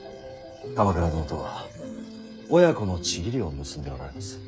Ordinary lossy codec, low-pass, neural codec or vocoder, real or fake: none; none; codec, 16 kHz, 8 kbps, FreqCodec, smaller model; fake